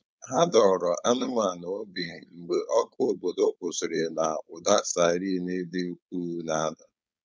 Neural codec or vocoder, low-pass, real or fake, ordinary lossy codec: codec, 16 kHz, 4.8 kbps, FACodec; none; fake; none